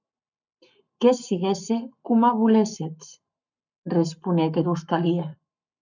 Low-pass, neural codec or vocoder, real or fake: 7.2 kHz; codec, 44.1 kHz, 7.8 kbps, Pupu-Codec; fake